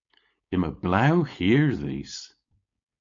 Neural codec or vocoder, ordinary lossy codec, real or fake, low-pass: codec, 16 kHz, 4.8 kbps, FACodec; MP3, 48 kbps; fake; 7.2 kHz